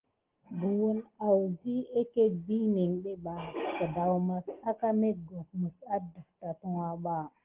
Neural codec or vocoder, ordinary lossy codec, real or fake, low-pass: none; Opus, 16 kbps; real; 3.6 kHz